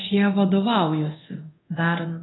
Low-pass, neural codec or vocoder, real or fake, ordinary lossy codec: 7.2 kHz; codec, 24 kHz, 0.9 kbps, DualCodec; fake; AAC, 16 kbps